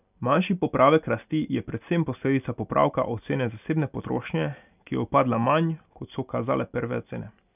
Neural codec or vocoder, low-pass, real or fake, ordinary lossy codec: none; 3.6 kHz; real; none